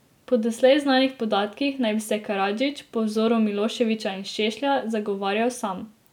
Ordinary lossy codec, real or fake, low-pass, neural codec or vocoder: none; real; 19.8 kHz; none